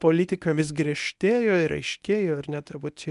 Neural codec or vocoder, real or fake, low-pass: codec, 24 kHz, 0.9 kbps, WavTokenizer, medium speech release version 1; fake; 10.8 kHz